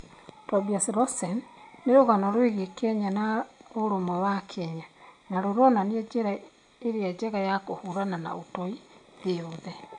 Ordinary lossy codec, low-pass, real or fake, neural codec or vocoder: AAC, 64 kbps; 9.9 kHz; real; none